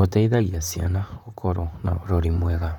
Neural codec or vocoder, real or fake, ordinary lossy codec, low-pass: vocoder, 44.1 kHz, 128 mel bands, Pupu-Vocoder; fake; none; 19.8 kHz